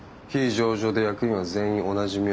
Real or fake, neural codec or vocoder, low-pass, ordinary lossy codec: real; none; none; none